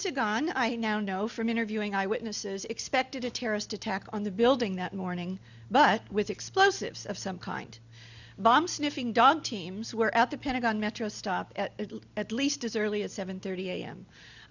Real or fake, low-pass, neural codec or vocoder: real; 7.2 kHz; none